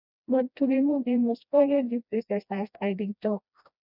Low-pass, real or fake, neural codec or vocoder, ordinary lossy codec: 5.4 kHz; fake; codec, 16 kHz, 1 kbps, FreqCodec, smaller model; none